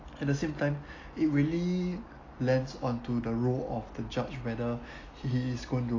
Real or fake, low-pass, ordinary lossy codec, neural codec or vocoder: real; 7.2 kHz; AAC, 32 kbps; none